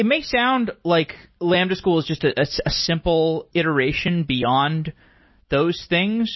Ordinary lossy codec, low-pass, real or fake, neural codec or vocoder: MP3, 24 kbps; 7.2 kHz; real; none